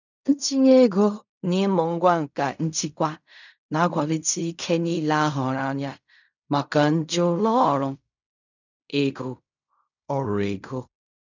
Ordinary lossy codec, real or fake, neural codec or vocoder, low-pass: none; fake; codec, 16 kHz in and 24 kHz out, 0.4 kbps, LongCat-Audio-Codec, fine tuned four codebook decoder; 7.2 kHz